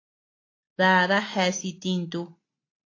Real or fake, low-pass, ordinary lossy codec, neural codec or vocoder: real; 7.2 kHz; AAC, 32 kbps; none